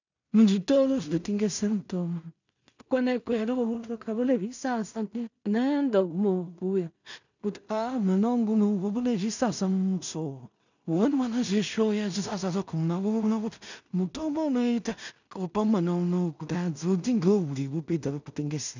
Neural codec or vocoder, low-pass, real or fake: codec, 16 kHz in and 24 kHz out, 0.4 kbps, LongCat-Audio-Codec, two codebook decoder; 7.2 kHz; fake